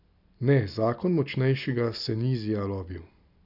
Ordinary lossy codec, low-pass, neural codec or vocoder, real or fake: none; 5.4 kHz; none; real